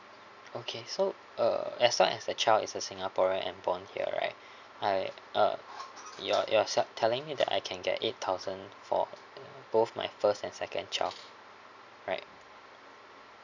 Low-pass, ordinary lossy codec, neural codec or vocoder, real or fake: 7.2 kHz; none; none; real